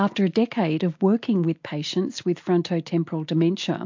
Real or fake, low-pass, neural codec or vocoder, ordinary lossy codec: real; 7.2 kHz; none; MP3, 48 kbps